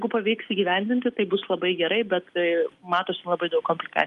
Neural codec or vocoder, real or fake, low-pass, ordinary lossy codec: none; real; 10.8 kHz; Opus, 32 kbps